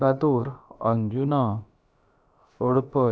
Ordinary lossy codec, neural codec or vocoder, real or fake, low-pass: none; codec, 16 kHz, about 1 kbps, DyCAST, with the encoder's durations; fake; none